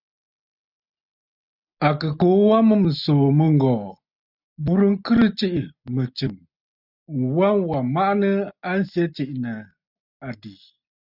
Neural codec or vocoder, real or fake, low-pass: none; real; 5.4 kHz